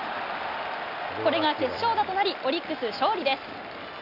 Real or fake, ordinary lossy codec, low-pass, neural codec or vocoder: real; none; 5.4 kHz; none